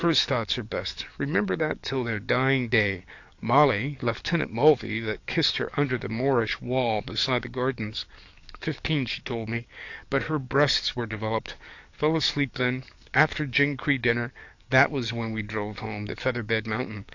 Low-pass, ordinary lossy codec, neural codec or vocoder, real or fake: 7.2 kHz; AAC, 48 kbps; codec, 44.1 kHz, 7.8 kbps, DAC; fake